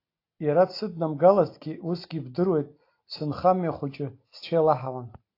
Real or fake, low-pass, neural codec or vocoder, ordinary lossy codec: real; 5.4 kHz; none; AAC, 32 kbps